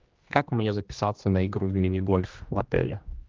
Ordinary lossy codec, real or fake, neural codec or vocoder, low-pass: Opus, 32 kbps; fake; codec, 16 kHz, 1 kbps, X-Codec, HuBERT features, trained on general audio; 7.2 kHz